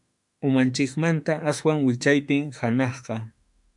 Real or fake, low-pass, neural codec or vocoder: fake; 10.8 kHz; autoencoder, 48 kHz, 32 numbers a frame, DAC-VAE, trained on Japanese speech